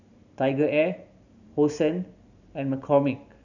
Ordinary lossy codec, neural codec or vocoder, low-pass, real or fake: none; vocoder, 44.1 kHz, 128 mel bands every 512 samples, BigVGAN v2; 7.2 kHz; fake